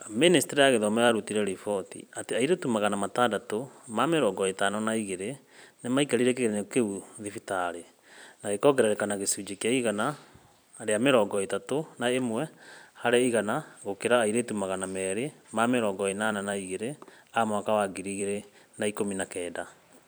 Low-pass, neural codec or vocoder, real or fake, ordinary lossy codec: none; vocoder, 44.1 kHz, 128 mel bands every 256 samples, BigVGAN v2; fake; none